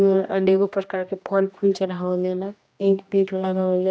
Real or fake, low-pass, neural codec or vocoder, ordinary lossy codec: fake; none; codec, 16 kHz, 1 kbps, X-Codec, HuBERT features, trained on general audio; none